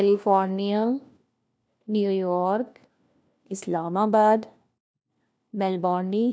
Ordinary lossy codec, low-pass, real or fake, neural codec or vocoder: none; none; fake; codec, 16 kHz, 1 kbps, FunCodec, trained on LibriTTS, 50 frames a second